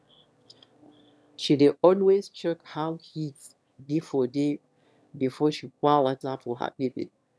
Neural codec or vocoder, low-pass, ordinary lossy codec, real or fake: autoencoder, 22.05 kHz, a latent of 192 numbers a frame, VITS, trained on one speaker; none; none; fake